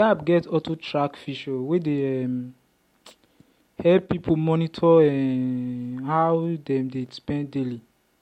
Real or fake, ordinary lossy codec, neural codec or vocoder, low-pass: real; MP3, 64 kbps; none; 19.8 kHz